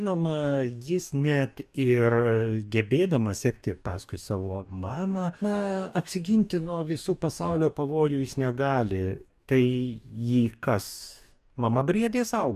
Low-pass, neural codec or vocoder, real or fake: 14.4 kHz; codec, 44.1 kHz, 2.6 kbps, DAC; fake